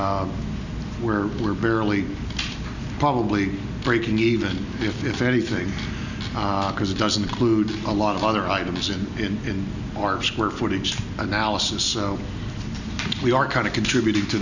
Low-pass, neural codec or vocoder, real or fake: 7.2 kHz; none; real